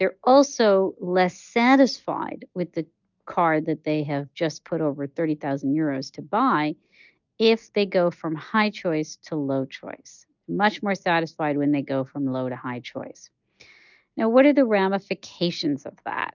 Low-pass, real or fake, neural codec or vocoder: 7.2 kHz; real; none